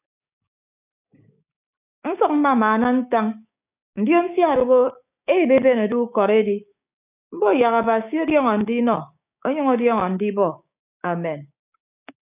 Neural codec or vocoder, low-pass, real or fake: codec, 16 kHz, 6 kbps, DAC; 3.6 kHz; fake